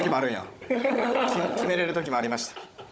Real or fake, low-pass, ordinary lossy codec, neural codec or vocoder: fake; none; none; codec, 16 kHz, 16 kbps, FunCodec, trained on Chinese and English, 50 frames a second